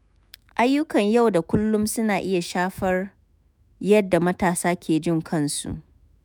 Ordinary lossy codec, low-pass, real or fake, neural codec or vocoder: none; none; fake; autoencoder, 48 kHz, 128 numbers a frame, DAC-VAE, trained on Japanese speech